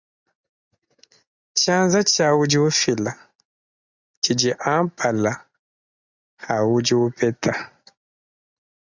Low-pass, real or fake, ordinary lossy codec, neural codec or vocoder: 7.2 kHz; real; Opus, 64 kbps; none